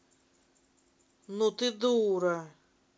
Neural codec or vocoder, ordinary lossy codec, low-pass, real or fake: none; none; none; real